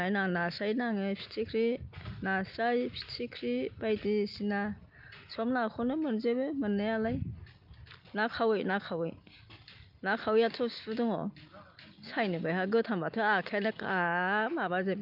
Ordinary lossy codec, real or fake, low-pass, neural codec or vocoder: Opus, 24 kbps; real; 5.4 kHz; none